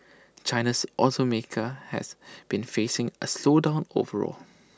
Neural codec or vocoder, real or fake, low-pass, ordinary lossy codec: none; real; none; none